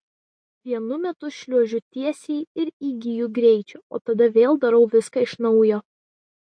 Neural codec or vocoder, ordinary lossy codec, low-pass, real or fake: none; MP3, 48 kbps; 9.9 kHz; real